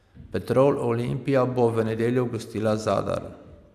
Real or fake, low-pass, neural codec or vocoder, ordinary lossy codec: real; 14.4 kHz; none; AAC, 96 kbps